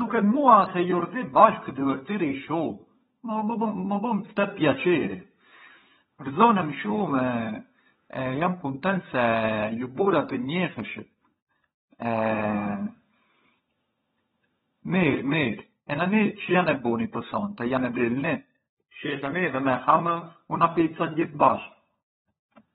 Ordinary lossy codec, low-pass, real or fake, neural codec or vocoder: AAC, 16 kbps; 7.2 kHz; fake; codec, 16 kHz, 16 kbps, FunCodec, trained on LibriTTS, 50 frames a second